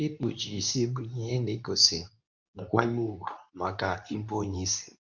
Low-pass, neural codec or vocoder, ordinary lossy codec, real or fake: 7.2 kHz; codec, 24 kHz, 0.9 kbps, WavTokenizer, medium speech release version 2; none; fake